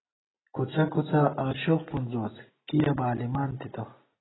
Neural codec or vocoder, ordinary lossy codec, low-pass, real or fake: none; AAC, 16 kbps; 7.2 kHz; real